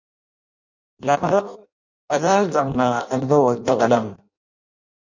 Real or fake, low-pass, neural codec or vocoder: fake; 7.2 kHz; codec, 16 kHz in and 24 kHz out, 0.6 kbps, FireRedTTS-2 codec